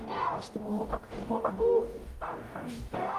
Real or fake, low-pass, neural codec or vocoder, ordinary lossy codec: fake; 14.4 kHz; codec, 44.1 kHz, 0.9 kbps, DAC; Opus, 24 kbps